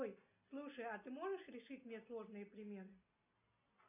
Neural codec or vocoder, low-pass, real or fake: none; 3.6 kHz; real